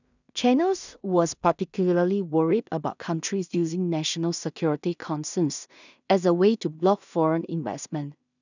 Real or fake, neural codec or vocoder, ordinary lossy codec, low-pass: fake; codec, 16 kHz in and 24 kHz out, 0.4 kbps, LongCat-Audio-Codec, two codebook decoder; none; 7.2 kHz